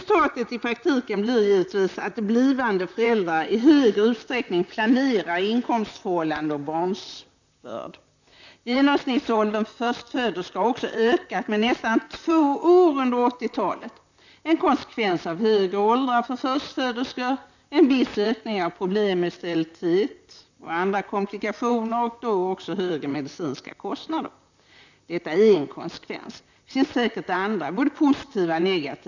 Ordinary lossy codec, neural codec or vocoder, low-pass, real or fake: none; vocoder, 44.1 kHz, 128 mel bands, Pupu-Vocoder; 7.2 kHz; fake